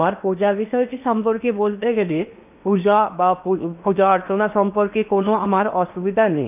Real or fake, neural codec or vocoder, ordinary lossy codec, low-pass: fake; codec, 16 kHz in and 24 kHz out, 0.8 kbps, FocalCodec, streaming, 65536 codes; none; 3.6 kHz